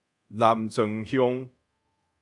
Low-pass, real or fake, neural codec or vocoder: 10.8 kHz; fake; codec, 24 kHz, 0.5 kbps, DualCodec